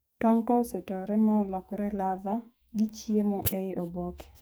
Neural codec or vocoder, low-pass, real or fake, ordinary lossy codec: codec, 44.1 kHz, 2.6 kbps, SNAC; none; fake; none